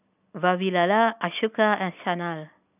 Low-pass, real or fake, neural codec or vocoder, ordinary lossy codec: 3.6 kHz; real; none; none